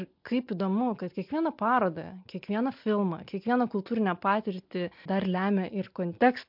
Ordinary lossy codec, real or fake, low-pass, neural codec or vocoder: MP3, 48 kbps; real; 5.4 kHz; none